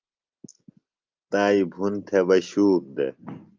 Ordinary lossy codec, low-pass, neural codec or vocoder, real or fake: Opus, 32 kbps; 7.2 kHz; none; real